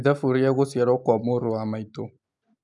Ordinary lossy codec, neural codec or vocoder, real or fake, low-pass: none; none; real; 10.8 kHz